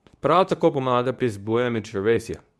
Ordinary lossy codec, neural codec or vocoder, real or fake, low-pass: none; codec, 24 kHz, 0.9 kbps, WavTokenizer, medium speech release version 2; fake; none